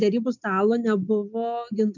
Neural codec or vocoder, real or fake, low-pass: none; real; 7.2 kHz